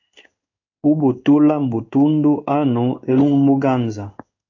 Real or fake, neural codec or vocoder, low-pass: fake; codec, 16 kHz in and 24 kHz out, 1 kbps, XY-Tokenizer; 7.2 kHz